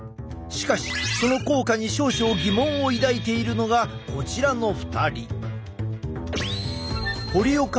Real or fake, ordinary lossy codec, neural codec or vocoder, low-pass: real; none; none; none